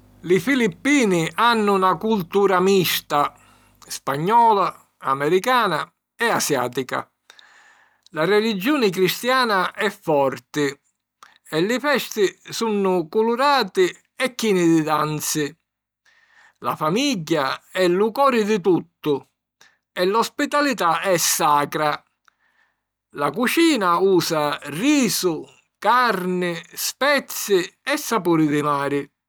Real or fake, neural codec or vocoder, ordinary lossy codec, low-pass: real; none; none; none